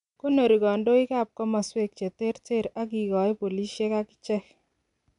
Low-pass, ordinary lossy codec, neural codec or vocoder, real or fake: 10.8 kHz; none; none; real